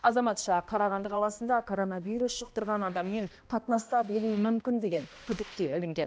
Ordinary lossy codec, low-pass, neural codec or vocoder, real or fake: none; none; codec, 16 kHz, 1 kbps, X-Codec, HuBERT features, trained on balanced general audio; fake